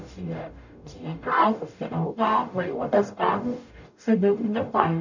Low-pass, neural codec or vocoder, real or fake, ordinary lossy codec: 7.2 kHz; codec, 44.1 kHz, 0.9 kbps, DAC; fake; none